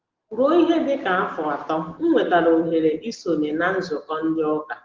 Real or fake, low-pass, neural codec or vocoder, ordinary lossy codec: real; 7.2 kHz; none; Opus, 16 kbps